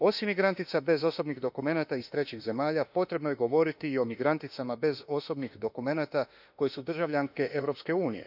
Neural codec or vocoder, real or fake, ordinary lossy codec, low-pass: autoencoder, 48 kHz, 32 numbers a frame, DAC-VAE, trained on Japanese speech; fake; none; 5.4 kHz